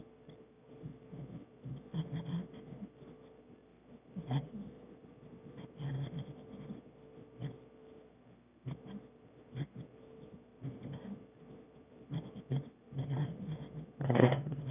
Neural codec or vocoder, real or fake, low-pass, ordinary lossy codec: autoencoder, 22.05 kHz, a latent of 192 numbers a frame, VITS, trained on one speaker; fake; 3.6 kHz; none